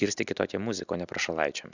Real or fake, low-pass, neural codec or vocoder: real; 7.2 kHz; none